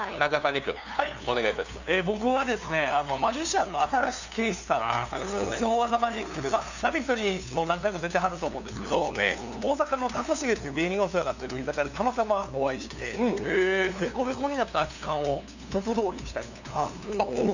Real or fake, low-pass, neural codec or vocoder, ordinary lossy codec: fake; 7.2 kHz; codec, 16 kHz, 2 kbps, FunCodec, trained on LibriTTS, 25 frames a second; none